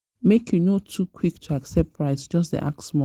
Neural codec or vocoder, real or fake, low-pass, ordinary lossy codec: none; real; 19.8 kHz; Opus, 16 kbps